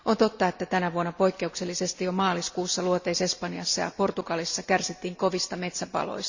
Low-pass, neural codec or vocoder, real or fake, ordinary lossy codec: 7.2 kHz; none; real; Opus, 64 kbps